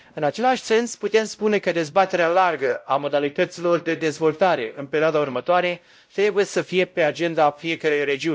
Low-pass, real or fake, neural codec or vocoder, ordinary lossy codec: none; fake; codec, 16 kHz, 0.5 kbps, X-Codec, WavLM features, trained on Multilingual LibriSpeech; none